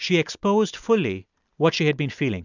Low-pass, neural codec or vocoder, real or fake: 7.2 kHz; none; real